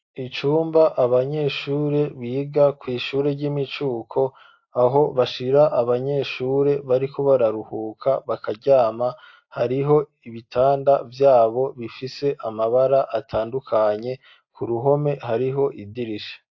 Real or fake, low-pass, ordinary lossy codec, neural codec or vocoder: real; 7.2 kHz; AAC, 48 kbps; none